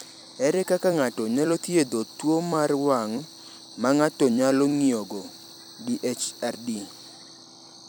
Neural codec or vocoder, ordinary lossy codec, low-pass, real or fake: vocoder, 44.1 kHz, 128 mel bands every 256 samples, BigVGAN v2; none; none; fake